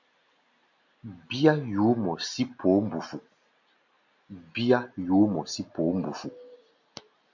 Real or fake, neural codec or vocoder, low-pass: real; none; 7.2 kHz